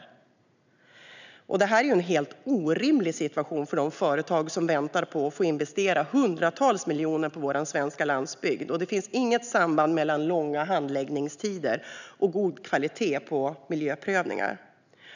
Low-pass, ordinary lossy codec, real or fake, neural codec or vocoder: 7.2 kHz; none; real; none